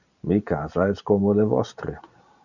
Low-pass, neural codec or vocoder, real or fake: 7.2 kHz; vocoder, 44.1 kHz, 128 mel bands every 512 samples, BigVGAN v2; fake